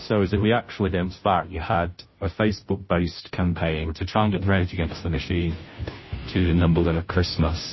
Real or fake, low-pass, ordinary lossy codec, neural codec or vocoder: fake; 7.2 kHz; MP3, 24 kbps; codec, 16 kHz, 0.5 kbps, FunCodec, trained on Chinese and English, 25 frames a second